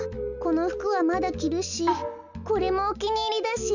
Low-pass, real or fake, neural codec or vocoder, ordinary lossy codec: 7.2 kHz; real; none; none